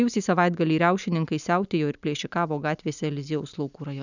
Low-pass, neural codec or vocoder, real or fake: 7.2 kHz; none; real